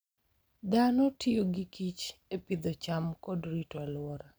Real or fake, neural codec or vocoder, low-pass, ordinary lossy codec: real; none; none; none